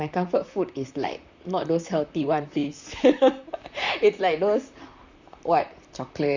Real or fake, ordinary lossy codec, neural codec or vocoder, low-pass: fake; Opus, 64 kbps; vocoder, 44.1 kHz, 128 mel bands, Pupu-Vocoder; 7.2 kHz